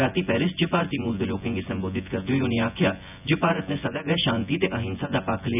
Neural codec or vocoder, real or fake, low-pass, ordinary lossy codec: vocoder, 24 kHz, 100 mel bands, Vocos; fake; 3.6 kHz; none